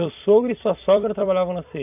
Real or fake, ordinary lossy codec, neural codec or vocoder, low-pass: fake; AAC, 32 kbps; vocoder, 44.1 kHz, 128 mel bands every 256 samples, BigVGAN v2; 3.6 kHz